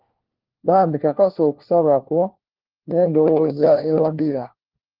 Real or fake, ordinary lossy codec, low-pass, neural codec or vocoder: fake; Opus, 16 kbps; 5.4 kHz; codec, 16 kHz, 1 kbps, FunCodec, trained on LibriTTS, 50 frames a second